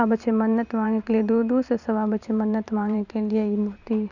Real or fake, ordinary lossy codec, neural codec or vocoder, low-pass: fake; none; vocoder, 44.1 kHz, 128 mel bands every 512 samples, BigVGAN v2; 7.2 kHz